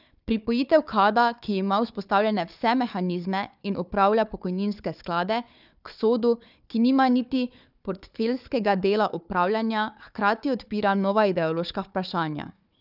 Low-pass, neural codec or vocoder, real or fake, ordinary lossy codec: 5.4 kHz; codec, 16 kHz, 4 kbps, FunCodec, trained on Chinese and English, 50 frames a second; fake; none